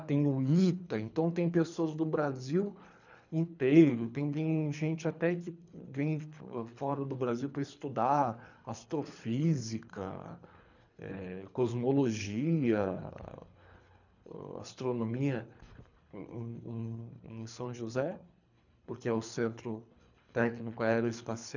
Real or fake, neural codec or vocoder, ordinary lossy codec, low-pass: fake; codec, 24 kHz, 3 kbps, HILCodec; none; 7.2 kHz